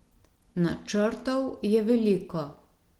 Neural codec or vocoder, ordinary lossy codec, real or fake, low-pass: none; Opus, 16 kbps; real; 19.8 kHz